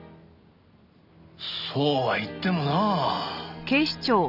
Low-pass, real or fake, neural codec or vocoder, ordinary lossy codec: 5.4 kHz; real; none; none